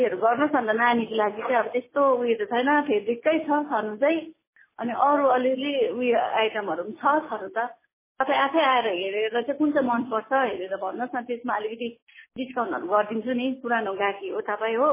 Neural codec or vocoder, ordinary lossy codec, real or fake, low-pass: none; MP3, 16 kbps; real; 3.6 kHz